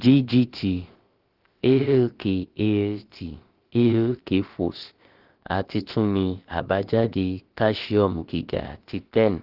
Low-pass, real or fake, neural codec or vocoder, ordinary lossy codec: 5.4 kHz; fake; codec, 16 kHz, about 1 kbps, DyCAST, with the encoder's durations; Opus, 16 kbps